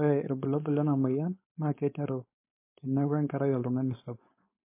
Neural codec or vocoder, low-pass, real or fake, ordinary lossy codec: codec, 16 kHz, 4.8 kbps, FACodec; 3.6 kHz; fake; MP3, 24 kbps